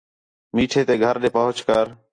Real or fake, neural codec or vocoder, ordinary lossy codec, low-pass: real; none; AAC, 48 kbps; 9.9 kHz